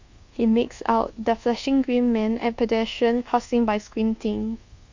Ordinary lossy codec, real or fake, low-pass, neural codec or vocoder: Opus, 64 kbps; fake; 7.2 kHz; codec, 24 kHz, 1.2 kbps, DualCodec